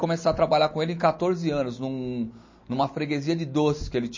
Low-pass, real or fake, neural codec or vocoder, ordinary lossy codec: 7.2 kHz; real; none; MP3, 32 kbps